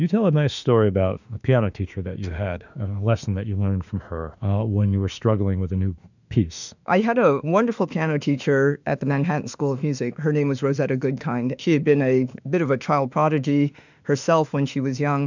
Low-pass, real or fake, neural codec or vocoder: 7.2 kHz; fake; autoencoder, 48 kHz, 32 numbers a frame, DAC-VAE, trained on Japanese speech